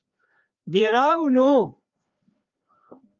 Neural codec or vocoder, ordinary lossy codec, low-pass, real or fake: codec, 16 kHz, 2 kbps, FreqCodec, larger model; Opus, 32 kbps; 7.2 kHz; fake